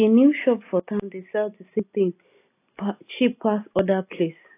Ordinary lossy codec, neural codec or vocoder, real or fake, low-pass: AAC, 24 kbps; none; real; 3.6 kHz